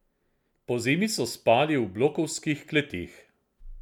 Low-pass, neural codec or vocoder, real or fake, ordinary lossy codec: 19.8 kHz; none; real; none